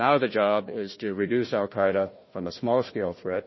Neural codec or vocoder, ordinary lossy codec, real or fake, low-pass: codec, 16 kHz, 1 kbps, FunCodec, trained on Chinese and English, 50 frames a second; MP3, 24 kbps; fake; 7.2 kHz